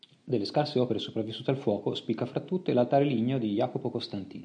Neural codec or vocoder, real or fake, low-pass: none; real; 9.9 kHz